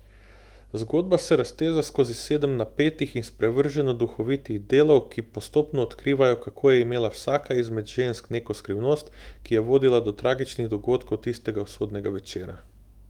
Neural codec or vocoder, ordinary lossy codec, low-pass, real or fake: autoencoder, 48 kHz, 128 numbers a frame, DAC-VAE, trained on Japanese speech; Opus, 24 kbps; 19.8 kHz; fake